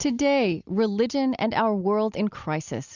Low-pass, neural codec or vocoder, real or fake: 7.2 kHz; none; real